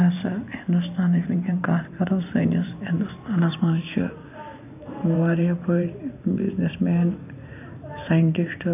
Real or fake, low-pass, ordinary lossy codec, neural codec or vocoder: fake; 3.6 kHz; none; vocoder, 44.1 kHz, 128 mel bands every 512 samples, BigVGAN v2